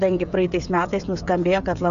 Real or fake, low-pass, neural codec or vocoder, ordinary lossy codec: fake; 7.2 kHz; codec, 16 kHz, 16 kbps, FreqCodec, smaller model; AAC, 96 kbps